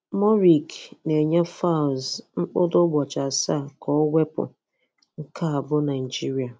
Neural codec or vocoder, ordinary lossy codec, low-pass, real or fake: none; none; none; real